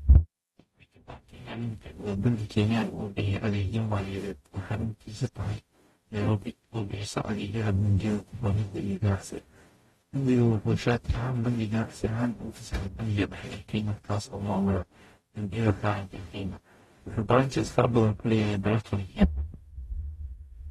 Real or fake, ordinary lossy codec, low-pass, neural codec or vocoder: fake; AAC, 32 kbps; 19.8 kHz; codec, 44.1 kHz, 0.9 kbps, DAC